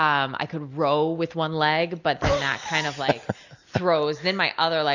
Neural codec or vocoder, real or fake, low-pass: none; real; 7.2 kHz